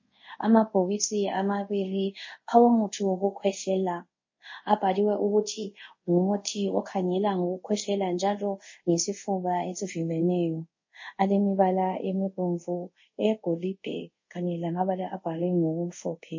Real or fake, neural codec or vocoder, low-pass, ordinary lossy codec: fake; codec, 24 kHz, 0.5 kbps, DualCodec; 7.2 kHz; MP3, 32 kbps